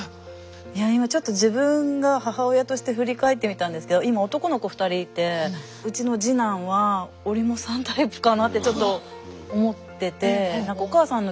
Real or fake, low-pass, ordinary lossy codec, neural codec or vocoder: real; none; none; none